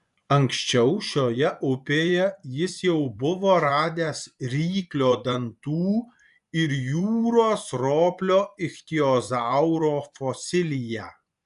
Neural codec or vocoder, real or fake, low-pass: vocoder, 24 kHz, 100 mel bands, Vocos; fake; 10.8 kHz